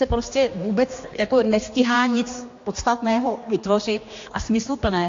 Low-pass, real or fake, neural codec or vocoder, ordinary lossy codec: 7.2 kHz; fake; codec, 16 kHz, 2 kbps, X-Codec, HuBERT features, trained on general audio; AAC, 48 kbps